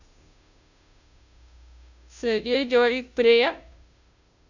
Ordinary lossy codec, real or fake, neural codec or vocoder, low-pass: none; fake; codec, 16 kHz, 0.5 kbps, FunCodec, trained on Chinese and English, 25 frames a second; 7.2 kHz